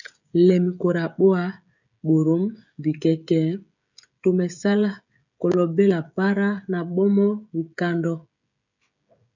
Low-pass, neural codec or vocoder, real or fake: 7.2 kHz; codec, 16 kHz, 16 kbps, FreqCodec, smaller model; fake